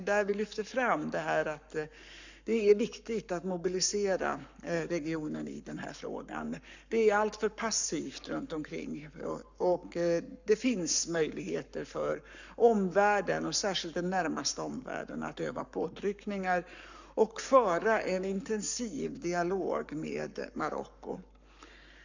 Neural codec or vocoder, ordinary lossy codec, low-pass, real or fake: codec, 44.1 kHz, 7.8 kbps, Pupu-Codec; none; 7.2 kHz; fake